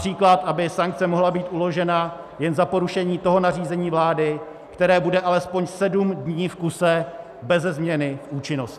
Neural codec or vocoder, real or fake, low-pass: none; real; 14.4 kHz